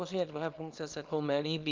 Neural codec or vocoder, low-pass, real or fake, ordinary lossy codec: codec, 24 kHz, 0.9 kbps, WavTokenizer, small release; 7.2 kHz; fake; Opus, 32 kbps